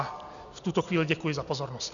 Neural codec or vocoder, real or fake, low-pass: none; real; 7.2 kHz